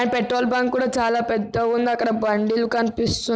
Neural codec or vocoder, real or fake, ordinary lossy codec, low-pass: none; real; none; none